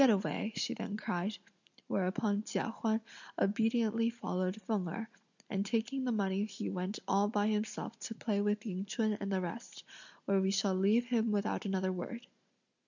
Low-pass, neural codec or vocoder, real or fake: 7.2 kHz; none; real